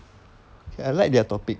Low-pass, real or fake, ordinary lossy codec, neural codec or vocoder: none; real; none; none